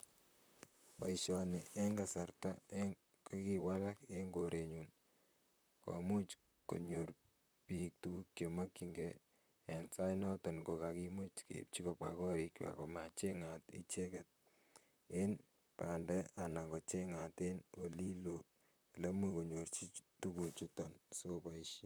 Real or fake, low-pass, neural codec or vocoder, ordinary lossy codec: fake; none; vocoder, 44.1 kHz, 128 mel bands, Pupu-Vocoder; none